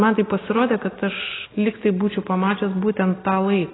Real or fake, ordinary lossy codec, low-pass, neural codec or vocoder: real; AAC, 16 kbps; 7.2 kHz; none